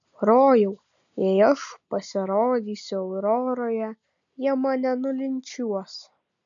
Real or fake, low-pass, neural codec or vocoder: real; 7.2 kHz; none